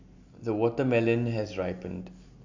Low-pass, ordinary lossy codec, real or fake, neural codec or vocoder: 7.2 kHz; AAC, 48 kbps; real; none